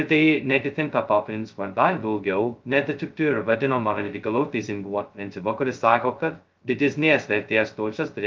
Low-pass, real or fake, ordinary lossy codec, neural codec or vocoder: 7.2 kHz; fake; Opus, 32 kbps; codec, 16 kHz, 0.2 kbps, FocalCodec